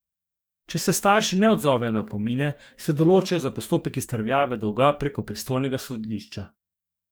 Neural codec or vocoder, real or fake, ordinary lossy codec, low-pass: codec, 44.1 kHz, 2.6 kbps, DAC; fake; none; none